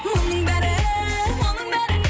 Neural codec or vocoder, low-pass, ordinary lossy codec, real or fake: none; none; none; real